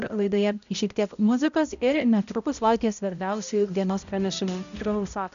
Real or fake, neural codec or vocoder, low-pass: fake; codec, 16 kHz, 0.5 kbps, X-Codec, HuBERT features, trained on balanced general audio; 7.2 kHz